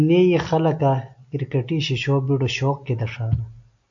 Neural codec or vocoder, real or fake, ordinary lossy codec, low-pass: none; real; AAC, 64 kbps; 7.2 kHz